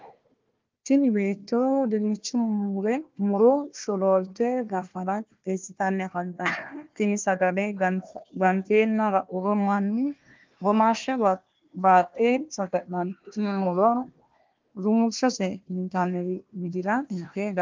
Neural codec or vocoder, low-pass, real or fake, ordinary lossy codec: codec, 16 kHz, 1 kbps, FunCodec, trained on Chinese and English, 50 frames a second; 7.2 kHz; fake; Opus, 24 kbps